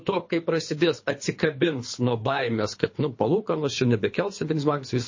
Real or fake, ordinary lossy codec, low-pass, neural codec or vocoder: fake; MP3, 32 kbps; 7.2 kHz; codec, 24 kHz, 3 kbps, HILCodec